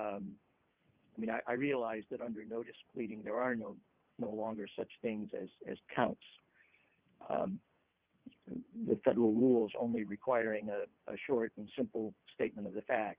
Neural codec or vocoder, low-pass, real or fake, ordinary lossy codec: vocoder, 22.05 kHz, 80 mel bands, WaveNeXt; 3.6 kHz; fake; Opus, 64 kbps